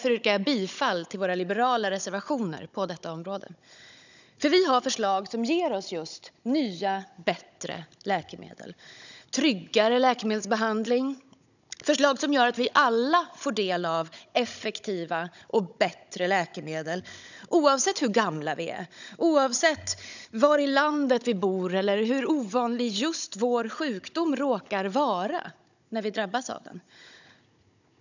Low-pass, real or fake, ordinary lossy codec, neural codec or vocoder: 7.2 kHz; fake; none; codec, 16 kHz, 16 kbps, FunCodec, trained on Chinese and English, 50 frames a second